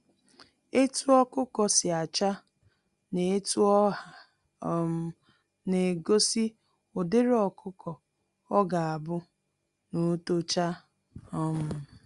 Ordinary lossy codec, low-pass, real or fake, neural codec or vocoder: Opus, 64 kbps; 10.8 kHz; real; none